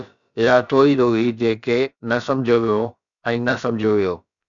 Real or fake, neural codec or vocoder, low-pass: fake; codec, 16 kHz, about 1 kbps, DyCAST, with the encoder's durations; 7.2 kHz